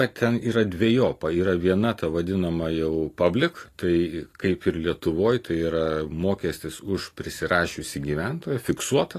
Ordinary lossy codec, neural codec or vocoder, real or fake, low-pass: AAC, 48 kbps; none; real; 14.4 kHz